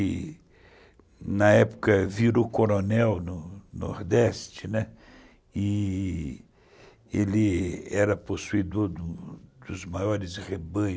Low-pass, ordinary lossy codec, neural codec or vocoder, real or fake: none; none; none; real